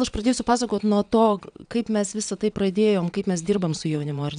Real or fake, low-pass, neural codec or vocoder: fake; 9.9 kHz; vocoder, 22.05 kHz, 80 mel bands, Vocos